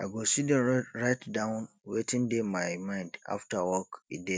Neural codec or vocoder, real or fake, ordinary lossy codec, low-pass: none; real; none; none